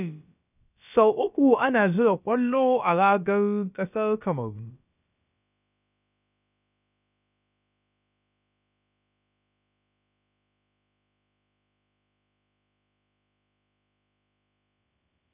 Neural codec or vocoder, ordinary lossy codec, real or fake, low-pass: codec, 16 kHz, about 1 kbps, DyCAST, with the encoder's durations; none; fake; 3.6 kHz